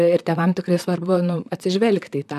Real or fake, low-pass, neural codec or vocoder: fake; 14.4 kHz; vocoder, 44.1 kHz, 128 mel bands, Pupu-Vocoder